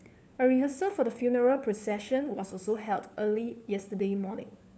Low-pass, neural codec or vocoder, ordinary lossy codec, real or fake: none; codec, 16 kHz, 4 kbps, FunCodec, trained on LibriTTS, 50 frames a second; none; fake